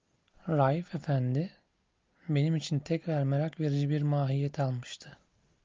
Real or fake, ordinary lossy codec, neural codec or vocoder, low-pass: real; Opus, 24 kbps; none; 7.2 kHz